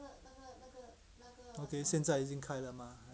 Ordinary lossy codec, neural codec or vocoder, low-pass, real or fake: none; none; none; real